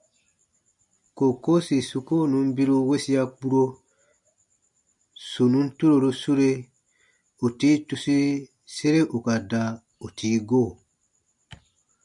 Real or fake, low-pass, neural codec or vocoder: real; 10.8 kHz; none